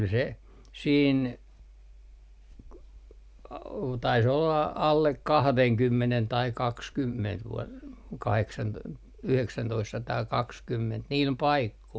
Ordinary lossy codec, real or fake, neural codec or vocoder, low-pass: none; real; none; none